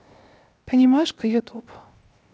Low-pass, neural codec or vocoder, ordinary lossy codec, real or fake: none; codec, 16 kHz, 0.7 kbps, FocalCodec; none; fake